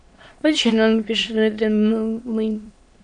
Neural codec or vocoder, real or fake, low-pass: autoencoder, 22.05 kHz, a latent of 192 numbers a frame, VITS, trained on many speakers; fake; 9.9 kHz